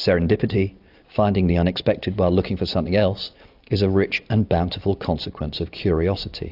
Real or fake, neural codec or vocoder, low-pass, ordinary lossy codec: real; none; 5.4 kHz; AAC, 48 kbps